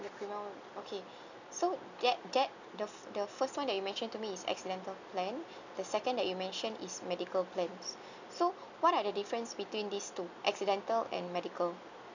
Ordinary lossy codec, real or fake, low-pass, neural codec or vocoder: none; real; 7.2 kHz; none